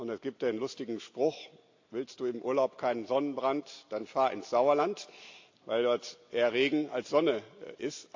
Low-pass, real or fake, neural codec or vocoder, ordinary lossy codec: 7.2 kHz; real; none; AAC, 48 kbps